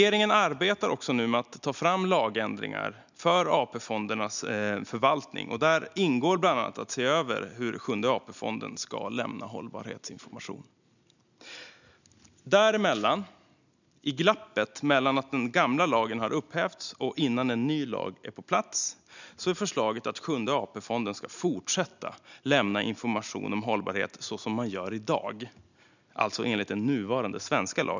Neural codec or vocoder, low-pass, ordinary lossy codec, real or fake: none; 7.2 kHz; none; real